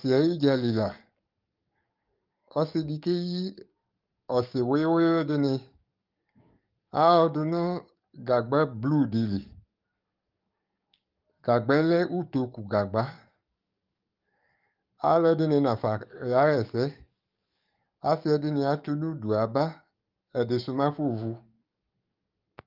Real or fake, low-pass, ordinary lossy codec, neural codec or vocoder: fake; 5.4 kHz; Opus, 24 kbps; codec, 44.1 kHz, 7.8 kbps, DAC